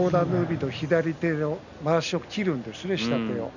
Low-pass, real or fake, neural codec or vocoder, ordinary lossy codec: 7.2 kHz; real; none; none